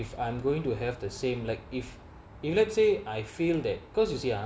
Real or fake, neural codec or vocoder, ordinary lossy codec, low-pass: real; none; none; none